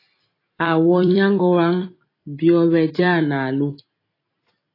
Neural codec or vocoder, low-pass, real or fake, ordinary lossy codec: vocoder, 44.1 kHz, 128 mel bands every 256 samples, BigVGAN v2; 5.4 kHz; fake; AAC, 24 kbps